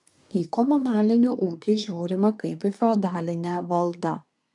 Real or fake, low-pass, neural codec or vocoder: fake; 10.8 kHz; codec, 24 kHz, 1 kbps, SNAC